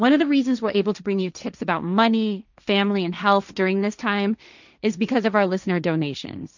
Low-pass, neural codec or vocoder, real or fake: 7.2 kHz; codec, 16 kHz, 1.1 kbps, Voila-Tokenizer; fake